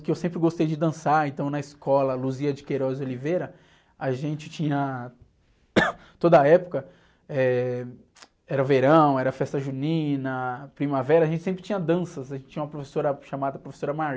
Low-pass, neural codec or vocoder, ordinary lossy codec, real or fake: none; none; none; real